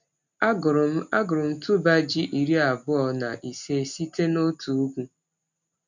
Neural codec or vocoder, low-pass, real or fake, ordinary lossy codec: none; 7.2 kHz; real; none